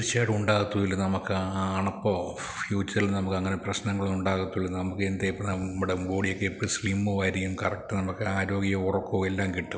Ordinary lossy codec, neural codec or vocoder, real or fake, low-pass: none; none; real; none